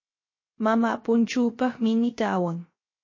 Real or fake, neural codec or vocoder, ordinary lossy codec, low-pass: fake; codec, 16 kHz, 0.3 kbps, FocalCodec; MP3, 32 kbps; 7.2 kHz